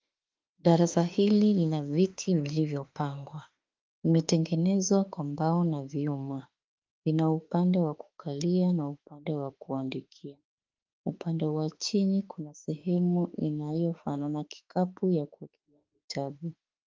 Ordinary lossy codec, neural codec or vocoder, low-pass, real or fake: Opus, 32 kbps; autoencoder, 48 kHz, 32 numbers a frame, DAC-VAE, trained on Japanese speech; 7.2 kHz; fake